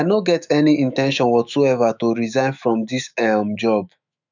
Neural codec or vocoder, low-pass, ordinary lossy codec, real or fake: autoencoder, 48 kHz, 128 numbers a frame, DAC-VAE, trained on Japanese speech; 7.2 kHz; none; fake